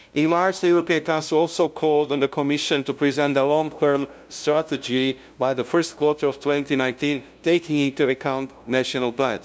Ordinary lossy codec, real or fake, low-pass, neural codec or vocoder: none; fake; none; codec, 16 kHz, 0.5 kbps, FunCodec, trained on LibriTTS, 25 frames a second